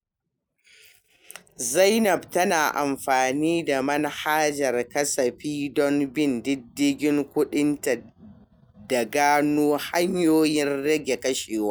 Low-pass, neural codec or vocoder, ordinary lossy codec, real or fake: none; none; none; real